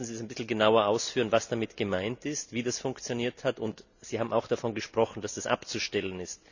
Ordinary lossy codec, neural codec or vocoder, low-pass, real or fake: none; none; 7.2 kHz; real